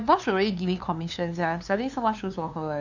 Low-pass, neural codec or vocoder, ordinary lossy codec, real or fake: 7.2 kHz; codec, 16 kHz, 2 kbps, FunCodec, trained on LibriTTS, 25 frames a second; none; fake